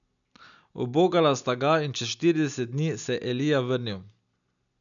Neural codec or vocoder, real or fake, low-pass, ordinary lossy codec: none; real; 7.2 kHz; none